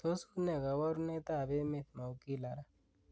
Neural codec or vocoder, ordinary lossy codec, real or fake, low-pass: none; none; real; none